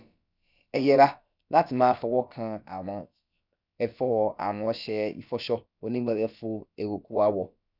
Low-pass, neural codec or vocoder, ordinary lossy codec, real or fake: 5.4 kHz; codec, 16 kHz, about 1 kbps, DyCAST, with the encoder's durations; none; fake